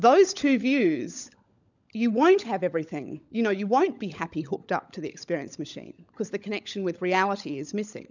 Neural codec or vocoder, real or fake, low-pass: codec, 16 kHz, 16 kbps, FunCodec, trained on LibriTTS, 50 frames a second; fake; 7.2 kHz